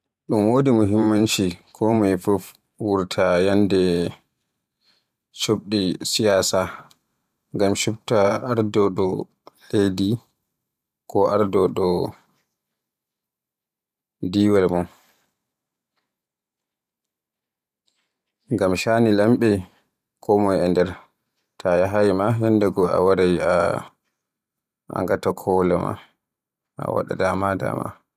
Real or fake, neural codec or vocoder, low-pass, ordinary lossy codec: fake; vocoder, 44.1 kHz, 128 mel bands every 512 samples, BigVGAN v2; 14.4 kHz; none